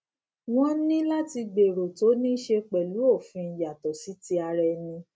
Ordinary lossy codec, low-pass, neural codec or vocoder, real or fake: none; none; none; real